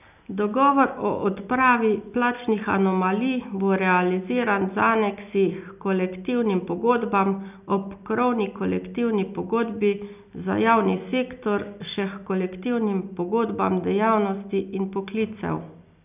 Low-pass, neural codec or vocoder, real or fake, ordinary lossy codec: 3.6 kHz; none; real; none